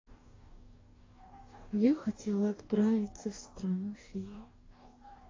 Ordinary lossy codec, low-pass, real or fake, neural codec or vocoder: AAC, 32 kbps; 7.2 kHz; fake; codec, 44.1 kHz, 2.6 kbps, DAC